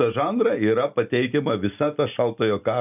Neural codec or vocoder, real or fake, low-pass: none; real; 3.6 kHz